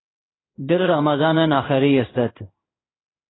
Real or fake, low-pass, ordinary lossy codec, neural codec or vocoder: fake; 7.2 kHz; AAC, 16 kbps; codec, 16 kHz in and 24 kHz out, 1 kbps, XY-Tokenizer